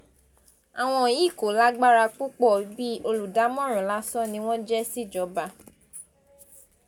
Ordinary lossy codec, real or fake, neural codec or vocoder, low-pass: none; real; none; none